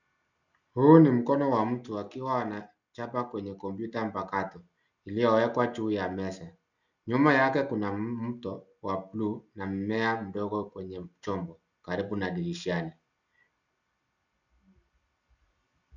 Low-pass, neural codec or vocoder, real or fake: 7.2 kHz; none; real